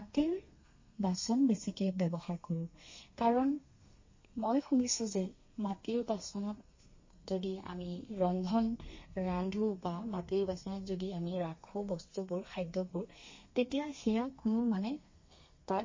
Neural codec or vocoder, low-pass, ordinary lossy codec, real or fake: codec, 32 kHz, 1.9 kbps, SNAC; 7.2 kHz; MP3, 32 kbps; fake